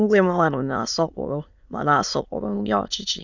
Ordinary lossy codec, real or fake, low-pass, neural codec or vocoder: none; fake; 7.2 kHz; autoencoder, 22.05 kHz, a latent of 192 numbers a frame, VITS, trained on many speakers